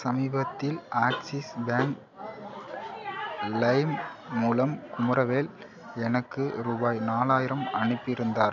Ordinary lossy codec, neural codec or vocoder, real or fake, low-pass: none; none; real; 7.2 kHz